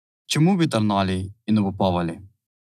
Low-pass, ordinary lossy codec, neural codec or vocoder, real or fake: 14.4 kHz; none; none; real